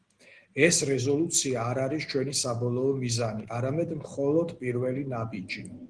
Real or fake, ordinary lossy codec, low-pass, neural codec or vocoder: real; Opus, 16 kbps; 9.9 kHz; none